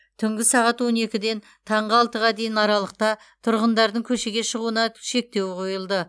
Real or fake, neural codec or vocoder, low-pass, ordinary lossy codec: real; none; none; none